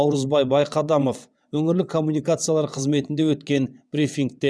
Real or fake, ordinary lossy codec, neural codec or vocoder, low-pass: fake; none; vocoder, 22.05 kHz, 80 mel bands, WaveNeXt; none